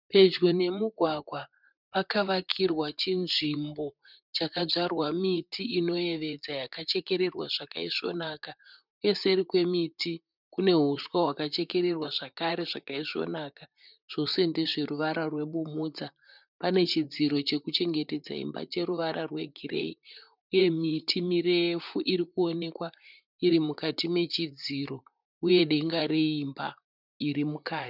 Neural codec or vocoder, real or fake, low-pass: vocoder, 44.1 kHz, 128 mel bands, Pupu-Vocoder; fake; 5.4 kHz